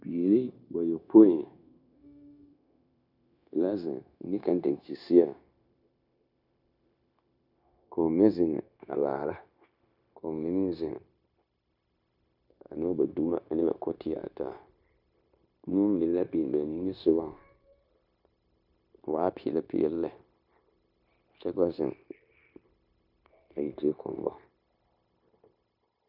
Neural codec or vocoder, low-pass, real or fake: codec, 16 kHz, 0.9 kbps, LongCat-Audio-Codec; 5.4 kHz; fake